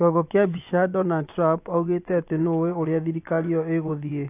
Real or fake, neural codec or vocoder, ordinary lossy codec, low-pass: fake; vocoder, 24 kHz, 100 mel bands, Vocos; AAC, 24 kbps; 3.6 kHz